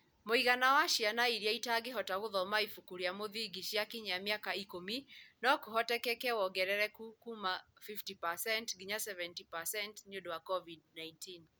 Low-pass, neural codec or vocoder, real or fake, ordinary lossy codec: none; none; real; none